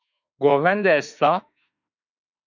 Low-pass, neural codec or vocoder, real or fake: 7.2 kHz; autoencoder, 48 kHz, 32 numbers a frame, DAC-VAE, trained on Japanese speech; fake